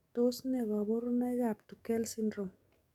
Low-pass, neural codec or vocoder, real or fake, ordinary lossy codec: 19.8 kHz; codec, 44.1 kHz, 7.8 kbps, DAC; fake; none